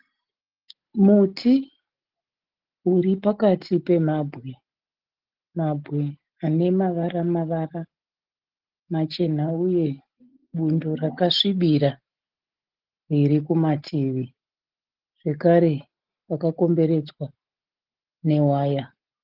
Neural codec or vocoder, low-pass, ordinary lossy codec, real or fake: none; 5.4 kHz; Opus, 16 kbps; real